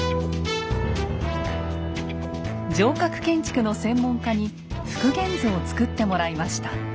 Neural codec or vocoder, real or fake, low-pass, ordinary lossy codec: none; real; none; none